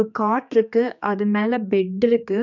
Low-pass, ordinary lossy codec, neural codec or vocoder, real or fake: 7.2 kHz; none; codec, 16 kHz, 2 kbps, X-Codec, HuBERT features, trained on general audio; fake